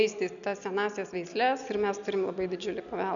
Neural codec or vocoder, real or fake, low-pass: none; real; 7.2 kHz